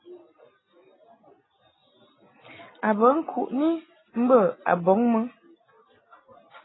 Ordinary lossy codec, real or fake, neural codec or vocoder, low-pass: AAC, 16 kbps; real; none; 7.2 kHz